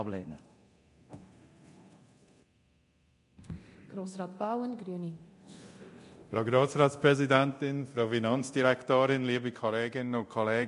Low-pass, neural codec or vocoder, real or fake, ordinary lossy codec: 10.8 kHz; codec, 24 kHz, 0.9 kbps, DualCodec; fake; MP3, 48 kbps